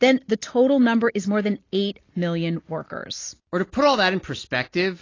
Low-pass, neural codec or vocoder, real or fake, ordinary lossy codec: 7.2 kHz; none; real; AAC, 32 kbps